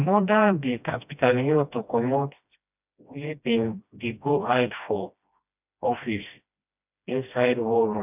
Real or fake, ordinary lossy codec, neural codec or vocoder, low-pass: fake; none; codec, 16 kHz, 1 kbps, FreqCodec, smaller model; 3.6 kHz